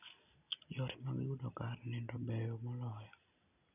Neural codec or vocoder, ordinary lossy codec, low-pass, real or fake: none; none; 3.6 kHz; real